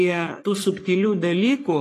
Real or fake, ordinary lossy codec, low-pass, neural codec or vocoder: fake; AAC, 48 kbps; 14.4 kHz; codec, 44.1 kHz, 3.4 kbps, Pupu-Codec